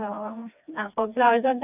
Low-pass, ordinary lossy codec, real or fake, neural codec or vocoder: 3.6 kHz; none; fake; codec, 16 kHz, 2 kbps, FreqCodec, smaller model